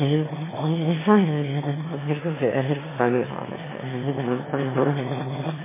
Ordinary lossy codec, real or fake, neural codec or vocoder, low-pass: MP3, 16 kbps; fake; autoencoder, 22.05 kHz, a latent of 192 numbers a frame, VITS, trained on one speaker; 3.6 kHz